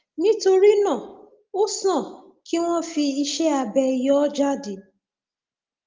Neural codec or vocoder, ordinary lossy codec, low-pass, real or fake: none; Opus, 32 kbps; 7.2 kHz; real